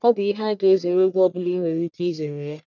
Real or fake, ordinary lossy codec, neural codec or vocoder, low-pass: fake; none; codec, 44.1 kHz, 1.7 kbps, Pupu-Codec; 7.2 kHz